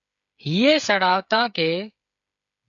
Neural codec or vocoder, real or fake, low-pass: codec, 16 kHz, 8 kbps, FreqCodec, smaller model; fake; 7.2 kHz